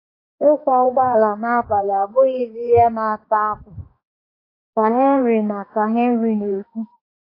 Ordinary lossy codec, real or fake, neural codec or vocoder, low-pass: AAC, 24 kbps; fake; codec, 16 kHz, 2 kbps, X-Codec, HuBERT features, trained on balanced general audio; 5.4 kHz